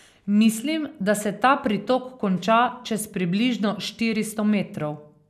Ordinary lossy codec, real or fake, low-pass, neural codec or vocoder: none; real; 14.4 kHz; none